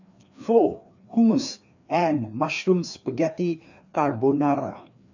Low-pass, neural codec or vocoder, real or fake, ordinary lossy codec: 7.2 kHz; codec, 16 kHz, 2 kbps, FreqCodec, larger model; fake; none